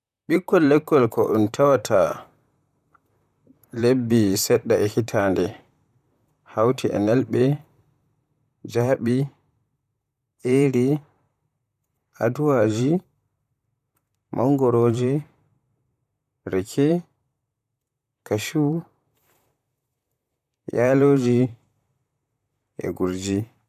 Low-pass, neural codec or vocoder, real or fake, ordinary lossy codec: 14.4 kHz; vocoder, 44.1 kHz, 128 mel bands, Pupu-Vocoder; fake; none